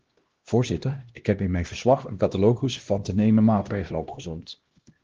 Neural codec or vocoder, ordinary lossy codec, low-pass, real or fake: codec, 16 kHz, 1 kbps, X-Codec, HuBERT features, trained on LibriSpeech; Opus, 16 kbps; 7.2 kHz; fake